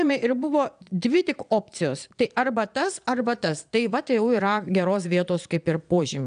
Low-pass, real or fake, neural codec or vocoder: 9.9 kHz; fake; vocoder, 22.05 kHz, 80 mel bands, Vocos